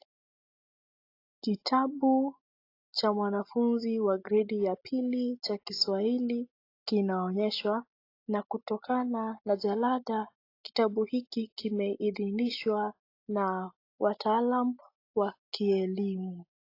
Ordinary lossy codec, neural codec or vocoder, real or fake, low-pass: AAC, 32 kbps; none; real; 5.4 kHz